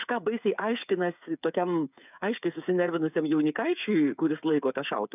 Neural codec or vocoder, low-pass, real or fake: codec, 16 kHz, 8 kbps, FreqCodec, smaller model; 3.6 kHz; fake